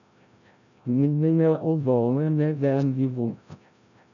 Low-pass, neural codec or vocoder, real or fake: 7.2 kHz; codec, 16 kHz, 0.5 kbps, FreqCodec, larger model; fake